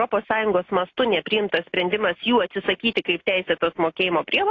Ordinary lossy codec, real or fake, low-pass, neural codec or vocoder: AAC, 32 kbps; real; 7.2 kHz; none